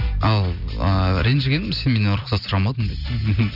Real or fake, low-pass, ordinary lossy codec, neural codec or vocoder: real; 5.4 kHz; none; none